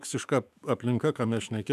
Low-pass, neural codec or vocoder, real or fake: 14.4 kHz; autoencoder, 48 kHz, 128 numbers a frame, DAC-VAE, trained on Japanese speech; fake